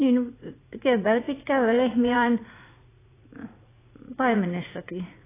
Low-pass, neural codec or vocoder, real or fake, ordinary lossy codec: 3.6 kHz; vocoder, 44.1 kHz, 80 mel bands, Vocos; fake; AAC, 16 kbps